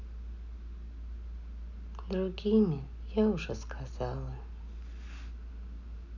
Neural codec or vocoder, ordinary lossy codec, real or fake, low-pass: none; none; real; 7.2 kHz